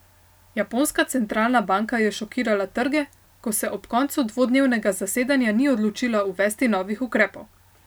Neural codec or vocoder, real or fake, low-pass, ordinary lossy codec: none; real; none; none